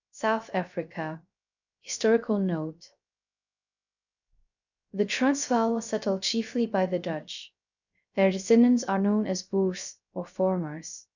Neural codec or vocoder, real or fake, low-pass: codec, 16 kHz, 0.3 kbps, FocalCodec; fake; 7.2 kHz